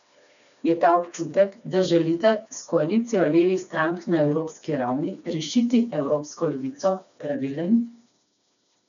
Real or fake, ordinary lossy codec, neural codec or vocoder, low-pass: fake; none; codec, 16 kHz, 2 kbps, FreqCodec, smaller model; 7.2 kHz